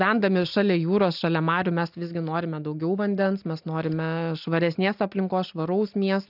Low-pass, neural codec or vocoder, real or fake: 5.4 kHz; none; real